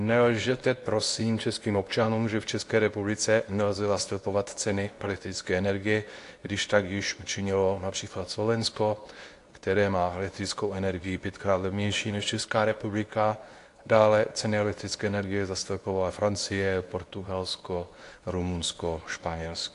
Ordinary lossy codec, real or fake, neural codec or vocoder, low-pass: AAC, 48 kbps; fake; codec, 24 kHz, 0.9 kbps, WavTokenizer, medium speech release version 2; 10.8 kHz